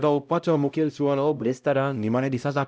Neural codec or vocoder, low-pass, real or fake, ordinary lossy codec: codec, 16 kHz, 0.5 kbps, X-Codec, HuBERT features, trained on LibriSpeech; none; fake; none